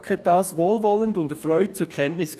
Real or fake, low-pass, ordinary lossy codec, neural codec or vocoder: fake; 14.4 kHz; none; codec, 44.1 kHz, 2.6 kbps, DAC